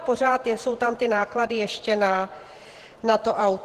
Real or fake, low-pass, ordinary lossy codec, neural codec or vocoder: fake; 14.4 kHz; Opus, 16 kbps; vocoder, 48 kHz, 128 mel bands, Vocos